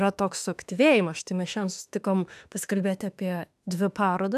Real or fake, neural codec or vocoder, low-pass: fake; autoencoder, 48 kHz, 32 numbers a frame, DAC-VAE, trained on Japanese speech; 14.4 kHz